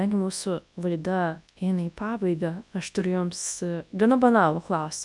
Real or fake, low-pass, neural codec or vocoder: fake; 10.8 kHz; codec, 24 kHz, 0.9 kbps, WavTokenizer, large speech release